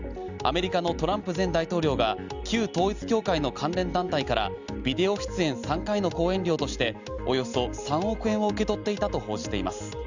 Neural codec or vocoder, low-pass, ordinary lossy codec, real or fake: none; 7.2 kHz; Opus, 64 kbps; real